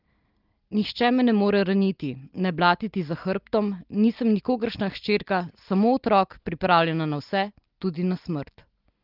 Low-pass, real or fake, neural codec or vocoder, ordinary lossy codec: 5.4 kHz; real; none; Opus, 32 kbps